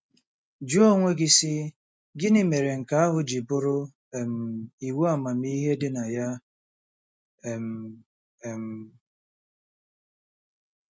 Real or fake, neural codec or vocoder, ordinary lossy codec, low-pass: real; none; none; none